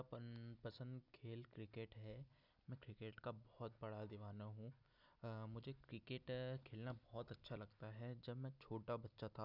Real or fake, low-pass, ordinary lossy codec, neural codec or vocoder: real; 5.4 kHz; none; none